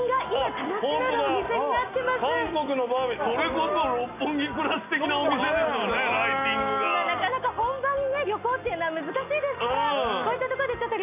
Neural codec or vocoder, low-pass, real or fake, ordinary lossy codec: none; 3.6 kHz; real; none